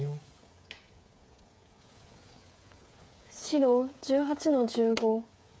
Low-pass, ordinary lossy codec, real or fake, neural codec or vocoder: none; none; fake; codec, 16 kHz, 8 kbps, FreqCodec, smaller model